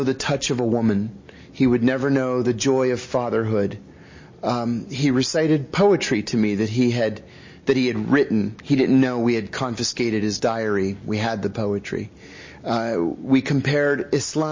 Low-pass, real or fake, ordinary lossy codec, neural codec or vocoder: 7.2 kHz; real; MP3, 32 kbps; none